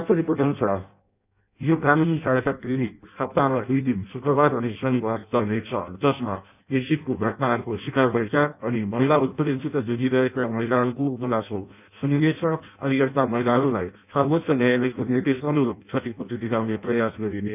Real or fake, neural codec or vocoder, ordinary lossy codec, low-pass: fake; codec, 16 kHz in and 24 kHz out, 0.6 kbps, FireRedTTS-2 codec; none; 3.6 kHz